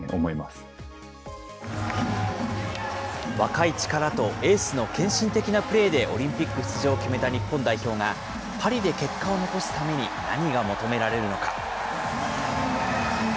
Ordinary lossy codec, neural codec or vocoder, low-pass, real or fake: none; none; none; real